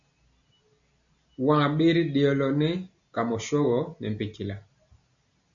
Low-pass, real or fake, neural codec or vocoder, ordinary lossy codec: 7.2 kHz; real; none; MP3, 96 kbps